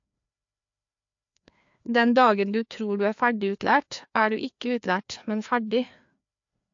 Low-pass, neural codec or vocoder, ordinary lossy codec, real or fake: 7.2 kHz; codec, 16 kHz, 2 kbps, FreqCodec, larger model; AAC, 64 kbps; fake